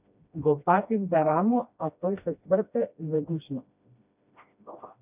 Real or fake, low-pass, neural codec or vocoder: fake; 3.6 kHz; codec, 16 kHz, 1 kbps, FreqCodec, smaller model